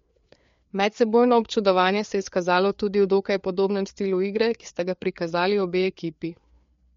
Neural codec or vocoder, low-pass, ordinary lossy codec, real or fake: codec, 16 kHz, 4 kbps, FreqCodec, larger model; 7.2 kHz; MP3, 48 kbps; fake